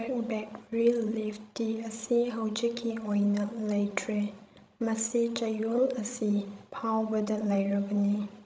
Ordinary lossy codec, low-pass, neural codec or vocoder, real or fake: none; none; codec, 16 kHz, 16 kbps, FreqCodec, larger model; fake